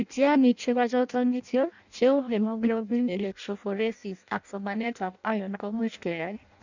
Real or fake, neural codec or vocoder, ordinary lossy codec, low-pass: fake; codec, 16 kHz in and 24 kHz out, 0.6 kbps, FireRedTTS-2 codec; none; 7.2 kHz